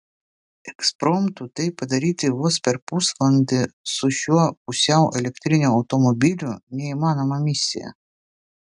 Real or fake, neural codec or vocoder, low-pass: real; none; 10.8 kHz